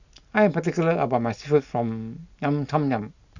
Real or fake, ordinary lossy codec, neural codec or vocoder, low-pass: real; none; none; 7.2 kHz